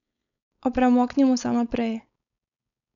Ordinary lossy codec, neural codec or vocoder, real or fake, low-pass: none; codec, 16 kHz, 4.8 kbps, FACodec; fake; 7.2 kHz